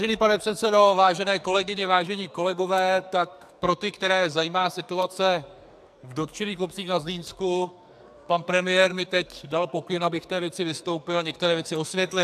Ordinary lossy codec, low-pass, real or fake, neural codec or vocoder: AAC, 96 kbps; 14.4 kHz; fake; codec, 44.1 kHz, 2.6 kbps, SNAC